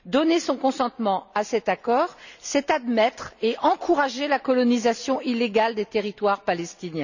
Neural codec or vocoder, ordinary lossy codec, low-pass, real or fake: none; none; 7.2 kHz; real